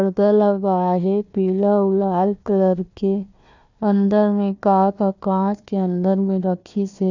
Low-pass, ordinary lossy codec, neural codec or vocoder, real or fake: 7.2 kHz; none; codec, 16 kHz, 1 kbps, FunCodec, trained on Chinese and English, 50 frames a second; fake